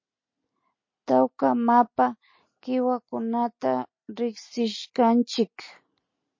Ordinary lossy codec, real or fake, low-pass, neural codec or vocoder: MP3, 32 kbps; real; 7.2 kHz; none